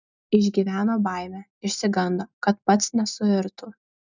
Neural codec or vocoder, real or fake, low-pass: none; real; 7.2 kHz